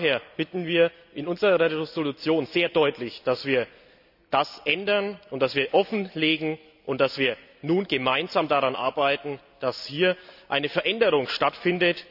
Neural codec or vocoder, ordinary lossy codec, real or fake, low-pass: none; none; real; 5.4 kHz